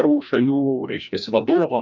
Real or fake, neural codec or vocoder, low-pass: fake; codec, 16 kHz, 1 kbps, FreqCodec, larger model; 7.2 kHz